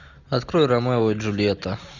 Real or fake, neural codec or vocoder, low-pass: real; none; 7.2 kHz